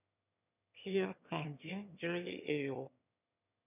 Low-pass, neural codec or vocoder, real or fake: 3.6 kHz; autoencoder, 22.05 kHz, a latent of 192 numbers a frame, VITS, trained on one speaker; fake